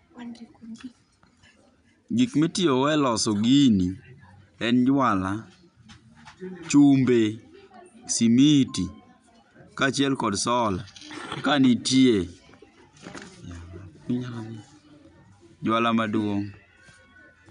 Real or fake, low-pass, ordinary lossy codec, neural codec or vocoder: real; 9.9 kHz; none; none